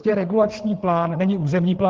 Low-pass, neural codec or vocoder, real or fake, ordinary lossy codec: 7.2 kHz; codec, 16 kHz, 8 kbps, FreqCodec, larger model; fake; Opus, 16 kbps